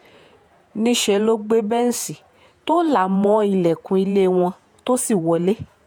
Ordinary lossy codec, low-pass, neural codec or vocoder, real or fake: none; none; vocoder, 48 kHz, 128 mel bands, Vocos; fake